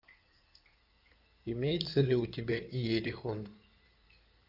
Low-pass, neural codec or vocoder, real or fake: 5.4 kHz; codec, 16 kHz in and 24 kHz out, 2.2 kbps, FireRedTTS-2 codec; fake